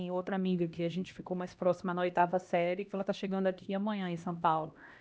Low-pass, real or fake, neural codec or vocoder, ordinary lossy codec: none; fake; codec, 16 kHz, 1 kbps, X-Codec, HuBERT features, trained on LibriSpeech; none